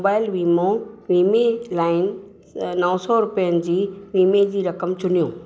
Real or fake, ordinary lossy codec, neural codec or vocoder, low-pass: real; none; none; none